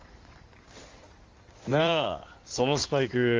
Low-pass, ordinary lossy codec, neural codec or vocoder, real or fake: 7.2 kHz; Opus, 32 kbps; codec, 16 kHz in and 24 kHz out, 2.2 kbps, FireRedTTS-2 codec; fake